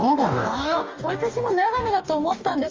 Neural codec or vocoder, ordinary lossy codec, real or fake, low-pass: codec, 44.1 kHz, 2.6 kbps, DAC; Opus, 32 kbps; fake; 7.2 kHz